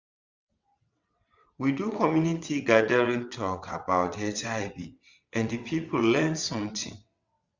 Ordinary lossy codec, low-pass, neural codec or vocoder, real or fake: Opus, 64 kbps; 7.2 kHz; vocoder, 22.05 kHz, 80 mel bands, WaveNeXt; fake